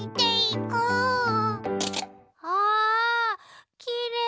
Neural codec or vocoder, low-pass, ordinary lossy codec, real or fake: none; none; none; real